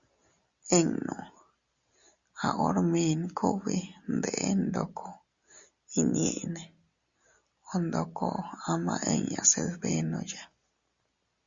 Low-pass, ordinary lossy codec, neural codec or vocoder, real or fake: 7.2 kHz; Opus, 64 kbps; none; real